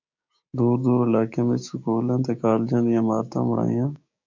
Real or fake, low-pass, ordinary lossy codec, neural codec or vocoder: fake; 7.2 kHz; MP3, 48 kbps; codec, 16 kHz, 6 kbps, DAC